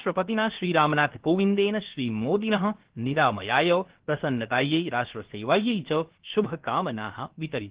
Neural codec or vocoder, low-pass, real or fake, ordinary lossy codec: codec, 16 kHz, about 1 kbps, DyCAST, with the encoder's durations; 3.6 kHz; fake; Opus, 16 kbps